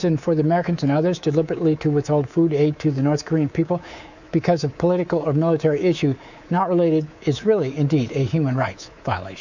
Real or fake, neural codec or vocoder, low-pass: fake; codec, 24 kHz, 3.1 kbps, DualCodec; 7.2 kHz